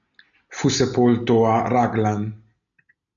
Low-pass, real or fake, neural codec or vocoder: 7.2 kHz; real; none